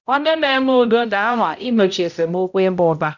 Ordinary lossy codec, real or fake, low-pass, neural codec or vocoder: none; fake; 7.2 kHz; codec, 16 kHz, 0.5 kbps, X-Codec, HuBERT features, trained on balanced general audio